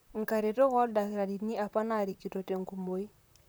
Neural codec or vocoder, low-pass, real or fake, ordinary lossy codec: vocoder, 44.1 kHz, 128 mel bands, Pupu-Vocoder; none; fake; none